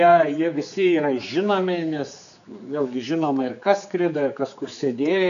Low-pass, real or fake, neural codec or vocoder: 7.2 kHz; fake; codec, 16 kHz, 4 kbps, X-Codec, HuBERT features, trained on general audio